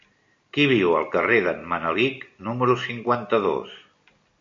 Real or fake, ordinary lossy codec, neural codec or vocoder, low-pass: real; MP3, 48 kbps; none; 7.2 kHz